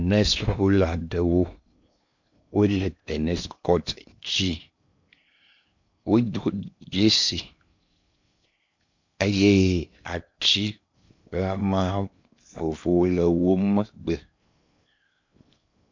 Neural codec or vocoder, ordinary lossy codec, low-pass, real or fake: codec, 16 kHz in and 24 kHz out, 0.8 kbps, FocalCodec, streaming, 65536 codes; MP3, 64 kbps; 7.2 kHz; fake